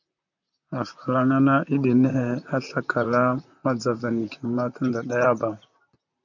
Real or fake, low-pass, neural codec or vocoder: fake; 7.2 kHz; vocoder, 44.1 kHz, 128 mel bands, Pupu-Vocoder